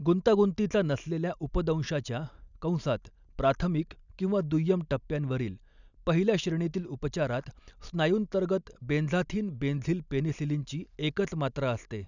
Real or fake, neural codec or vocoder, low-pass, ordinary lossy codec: real; none; 7.2 kHz; none